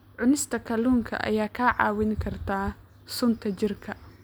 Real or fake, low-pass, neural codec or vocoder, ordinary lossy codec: real; none; none; none